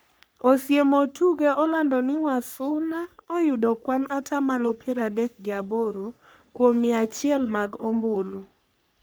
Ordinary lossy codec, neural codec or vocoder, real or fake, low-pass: none; codec, 44.1 kHz, 3.4 kbps, Pupu-Codec; fake; none